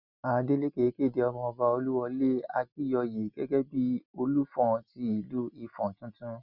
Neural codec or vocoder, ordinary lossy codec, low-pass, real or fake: none; none; 5.4 kHz; real